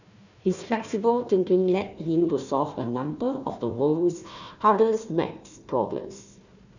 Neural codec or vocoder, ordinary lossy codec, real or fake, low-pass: codec, 16 kHz, 1 kbps, FunCodec, trained on Chinese and English, 50 frames a second; none; fake; 7.2 kHz